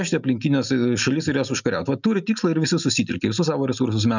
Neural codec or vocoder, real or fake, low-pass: none; real; 7.2 kHz